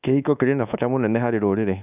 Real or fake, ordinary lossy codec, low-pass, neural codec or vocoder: fake; none; 3.6 kHz; codec, 24 kHz, 1.2 kbps, DualCodec